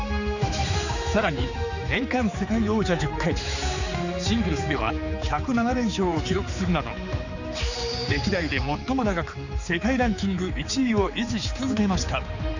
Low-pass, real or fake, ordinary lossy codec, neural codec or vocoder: 7.2 kHz; fake; none; codec, 16 kHz, 4 kbps, X-Codec, HuBERT features, trained on general audio